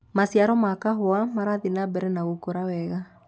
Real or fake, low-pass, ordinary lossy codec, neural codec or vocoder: real; none; none; none